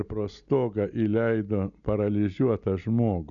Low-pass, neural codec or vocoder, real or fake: 7.2 kHz; none; real